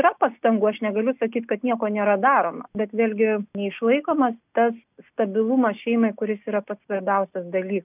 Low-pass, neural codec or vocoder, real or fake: 3.6 kHz; none; real